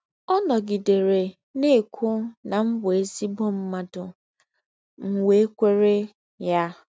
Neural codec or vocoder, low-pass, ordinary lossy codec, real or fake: none; none; none; real